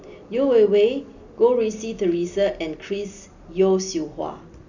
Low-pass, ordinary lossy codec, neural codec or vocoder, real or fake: 7.2 kHz; none; none; real